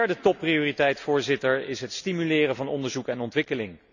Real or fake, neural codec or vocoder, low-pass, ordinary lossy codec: real; none; 7.2 kHz; none